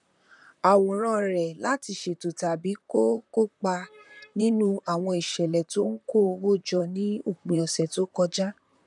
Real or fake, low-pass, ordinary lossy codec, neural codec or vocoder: fake; 10.8 kHz; none; vocoder, 44.1 kHz, 128 mel bands, Pupu-Vocoder